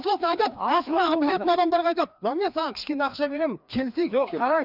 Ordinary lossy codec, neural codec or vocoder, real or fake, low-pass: none; codec, 16 kHz, 2 kbps, FreqCodec, larger model; fake; 5.4 kHz